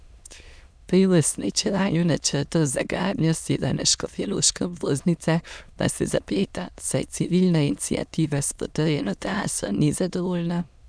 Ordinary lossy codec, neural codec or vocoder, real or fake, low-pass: none; autoencoder, 22.05 kHz, a latent of 192 numbers a frame, VITS, trained on many speakers; fake; none